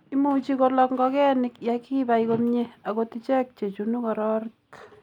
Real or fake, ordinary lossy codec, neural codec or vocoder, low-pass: real; none; none; 19.8 kHz